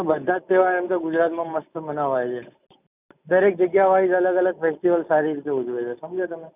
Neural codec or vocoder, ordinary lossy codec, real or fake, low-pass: none; none; real; 3.6 kHz